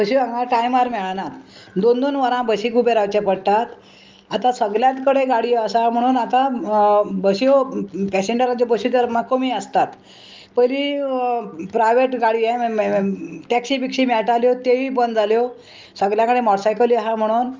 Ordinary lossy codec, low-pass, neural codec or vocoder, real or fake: Opus, 32 kbps; 7.2 kHz; none; real